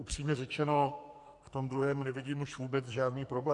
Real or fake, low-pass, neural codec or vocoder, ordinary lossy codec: fake; 10.8 kHz; codec, 44.1 kHz, 2.6 kbps, SNAC; MP3, 96 kbps